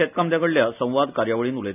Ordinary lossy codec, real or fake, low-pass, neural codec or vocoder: none; real; 3.6 kHz; none